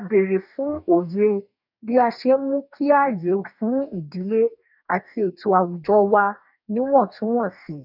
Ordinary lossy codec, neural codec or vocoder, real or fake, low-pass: none; codec, 44.1 kHz, 2.6 kbps, DAC; fake; 5.4 kHz